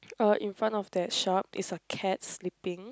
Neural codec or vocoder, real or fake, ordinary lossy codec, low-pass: none; real; none; none